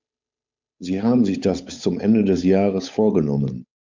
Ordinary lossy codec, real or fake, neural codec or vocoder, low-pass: MP3, 64 kbps; fake; codec, 16 kHz, 8 kbps, FunCodec, trained on Chinese and English, 25 frames a second; 7.2 kHz